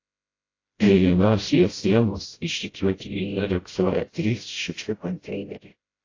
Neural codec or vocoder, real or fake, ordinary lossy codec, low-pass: codec, 16 kHz, 0.5 kbps, FreqCodec, smaller model; fake; AAC, 32 kbps; 7.2 kHz